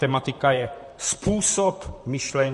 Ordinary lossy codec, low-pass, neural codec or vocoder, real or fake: MP3, 48 kbps; 14.4 kHz; vocoder, 44.1 kHz, 128 mel bands, Pupu-Vocoder; fake